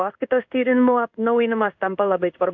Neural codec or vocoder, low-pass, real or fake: codec, 16 kHz in and 24 kHz out, 1 kbps, XY-Tokenizer; 7.2 kHz; fake